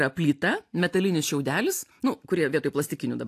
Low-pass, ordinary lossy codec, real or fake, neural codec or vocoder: 14.4 kHz; AAC, 64 kbps; real; none